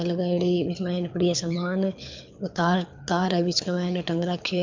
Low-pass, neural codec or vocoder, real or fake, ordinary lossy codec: 7.2 kHz; codec, 24 kHz, 6 kbps, HILCodec; fake; MP3, 64 kbps